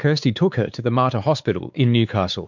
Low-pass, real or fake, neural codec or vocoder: 7.2 kHz; fake; codec, 16 kHz, 4 kbps, X-Codec, WavLM features, trained on Multilingual LibriSpeech